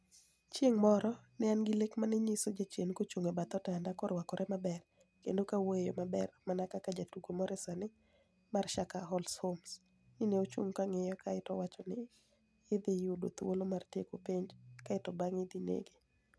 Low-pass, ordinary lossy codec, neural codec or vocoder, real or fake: none; none; none; real